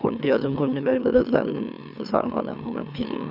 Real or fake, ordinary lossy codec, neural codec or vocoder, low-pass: fake; none; autoencoder, 44.1 kHz, a latent of 192 numbers a frame, MeloTTS; 5.4 kHz